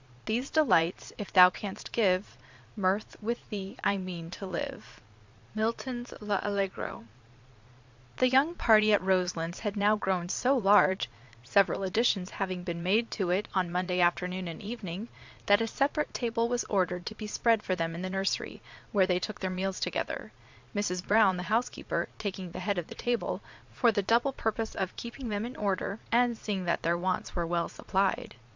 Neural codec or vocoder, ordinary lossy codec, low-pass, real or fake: vocoder, 22.05 kHz, 80 mel bands, Vocos; MP3, 64 kbps; 7.2 kHz; fake